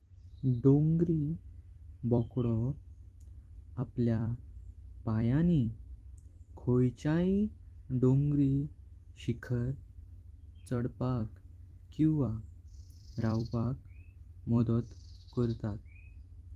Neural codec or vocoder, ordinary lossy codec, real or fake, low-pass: vocoder, 44.1 kHz, 128 mel bands every 256 samples, BigVGAN v2; Opus, 24 kbps; fake; 14.4 kHz